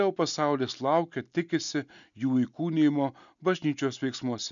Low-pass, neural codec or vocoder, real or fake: 7.2 kHz; none; real